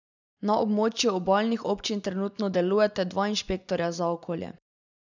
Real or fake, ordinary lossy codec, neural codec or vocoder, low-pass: real; none; none; 7.2 kHz